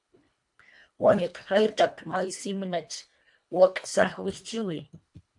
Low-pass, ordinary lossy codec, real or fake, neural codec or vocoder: 10.8 kHz; AAC, 64 kbps; fake; codec, 24 kHz, 1.5 kbps, HILCodec